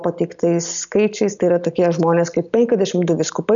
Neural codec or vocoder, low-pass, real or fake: none; 7.2 kHz; real